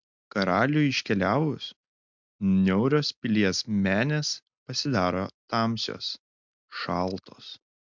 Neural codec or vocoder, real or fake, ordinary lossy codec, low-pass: none; real; MP3, 64 kbps; 7.2 kHz